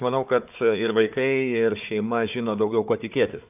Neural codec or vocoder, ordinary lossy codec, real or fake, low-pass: codec, 16 kHz, 4 kbps, FunCodec, trained on Chinese and English, 50 frames a second; AAC, 32 kbps; fake; 3.6 kHz